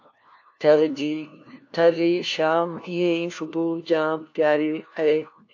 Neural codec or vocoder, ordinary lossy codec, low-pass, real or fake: codec, 16 kHz, 1 kbps, FunCodec, trained on LibriTTS, 50 frames a second; AAC, 48 kbps; 7.2 kHz; fake